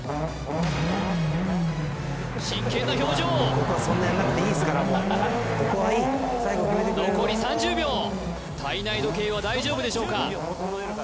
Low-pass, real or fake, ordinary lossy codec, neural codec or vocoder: none; real; none; none